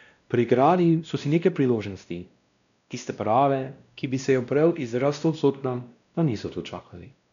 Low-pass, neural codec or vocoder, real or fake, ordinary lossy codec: 7.2 kHz; codec, 16 kHz, 1 kbps, X-Codec, WavLM features, trained on Multilingual LibriSpeech; fake; none